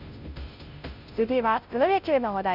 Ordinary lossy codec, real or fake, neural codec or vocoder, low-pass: none; fake; codec, 16 kHz, 0.5 kbps, FunCodec, trained on Chinese and English, 25 frames a second; 5.4 kHz